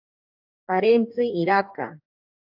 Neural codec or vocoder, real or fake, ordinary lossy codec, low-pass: codec, 44.1 kHz, 2.6 kbps, DAC; fake; AAC, 48 kbps; 5.4 kHz